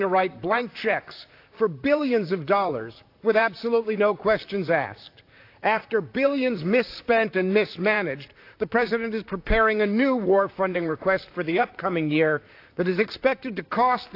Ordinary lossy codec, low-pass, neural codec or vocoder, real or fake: AAC, 32 kbps; 5.4 kHz; vocoder, 44.1 kHz, 128 mel bands, Pupu-Vocoder; fake